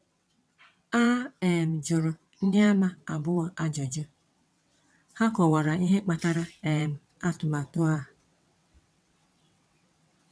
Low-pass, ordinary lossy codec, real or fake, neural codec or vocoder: none; none; fake; vocoder, 22.05 kHz, 80 mel bands, WaveNeXt